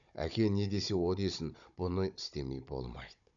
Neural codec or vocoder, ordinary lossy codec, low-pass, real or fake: codec, 16 kHz, 16 kbps, FunCodec, trained on Chinese and English, 50 frames a second; none; 7.2 kHz; fake